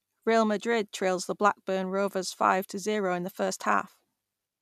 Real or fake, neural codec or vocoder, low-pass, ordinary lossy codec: real; none; 14.4 kHz; none